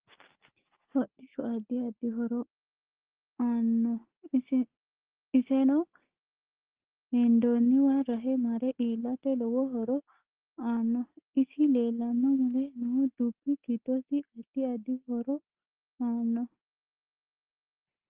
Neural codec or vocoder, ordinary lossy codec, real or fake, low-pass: none; Opus, 16 kbps; real; 3.6 kHz